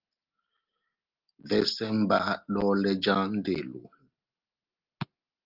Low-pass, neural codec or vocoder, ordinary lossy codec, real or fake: 5.4 kHz; none; Opus, 32 kbps; real